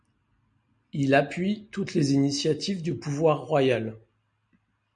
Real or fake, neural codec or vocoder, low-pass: real; none; 9.9 kHz